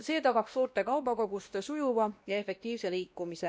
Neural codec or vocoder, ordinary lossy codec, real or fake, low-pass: codec, 16 kHz, 1 kbps, X-Codec, WavLM features, trained on Multilingual LibriSpeech; none; fake; none